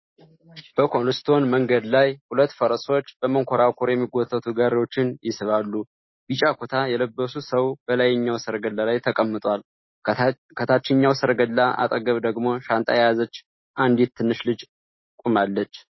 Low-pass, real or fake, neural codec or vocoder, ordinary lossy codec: 7.2 kHz; real; none; MP3, 24 kbps